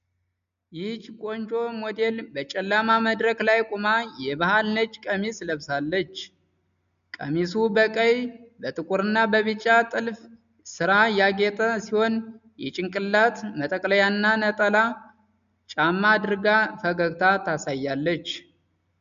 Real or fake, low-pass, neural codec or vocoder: real; 7.2 kHz; none